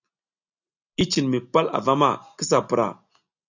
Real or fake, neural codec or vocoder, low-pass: real; none; 7.2 kHz